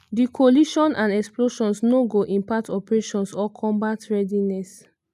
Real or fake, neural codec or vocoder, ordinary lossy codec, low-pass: real; none; none; 14.4 kHz